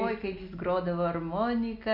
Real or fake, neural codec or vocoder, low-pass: real; none; 5.4 kHz